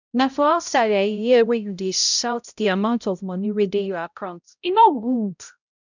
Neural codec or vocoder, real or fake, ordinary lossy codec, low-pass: codec, 16 kHz, 0.5 kbps, X-Codec, HuBERT features, trained on balanced general audio; fake; none; 7.2 kHz